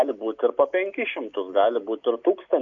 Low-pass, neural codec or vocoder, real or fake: 7.2 kHz; none; real